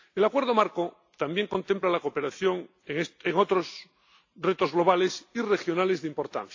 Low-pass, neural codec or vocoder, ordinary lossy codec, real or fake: 7.2 kHz; none; AAC, 48 kbps; real